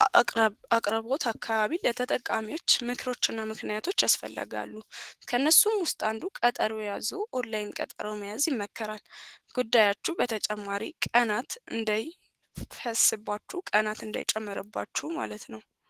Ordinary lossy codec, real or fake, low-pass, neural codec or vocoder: Opus, 16 kbps; fake; 14.4 kHz; autoencoder, 48 kHz, 128 numbers a frame, DAC-VAE, trained on Japanese speech